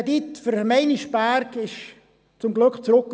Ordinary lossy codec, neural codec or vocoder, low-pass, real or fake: none; none; none; real